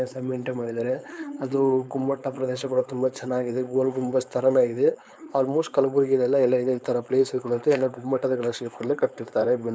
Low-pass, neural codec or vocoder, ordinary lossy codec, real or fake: none; codec, 16 kHz, 4.8 kbps, FACodec; none; fake